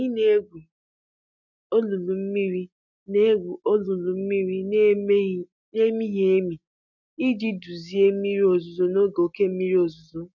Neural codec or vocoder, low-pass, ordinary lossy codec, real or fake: none; 7.2 kHz; none; real